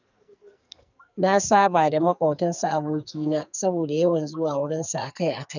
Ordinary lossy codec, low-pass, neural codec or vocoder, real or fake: none; 7.2 kHz; codec, 44.1 kHz, 2.6 kbps, SNAC; fake